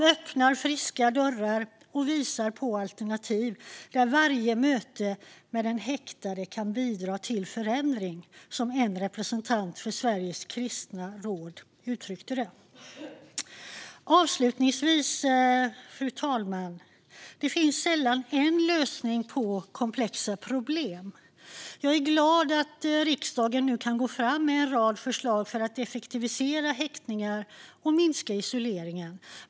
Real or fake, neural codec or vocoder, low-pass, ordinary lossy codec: real; none; none; none